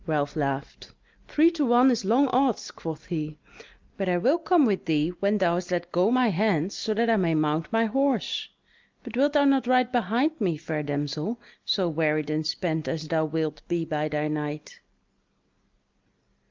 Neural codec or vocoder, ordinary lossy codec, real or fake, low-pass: none; Opus, 32 kbps; real; 7.2 kHz